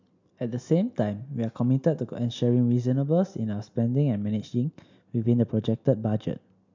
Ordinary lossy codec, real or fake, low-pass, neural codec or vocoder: MP3, 64 kbps; real; 7.2 kHz; none